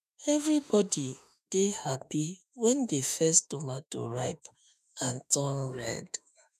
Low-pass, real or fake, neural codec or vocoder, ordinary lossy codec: 14.4 kHz; fake; autoencoder, 48 kHz, 32 numbers a frame, DAC-VAE, trained on Japanese speech; none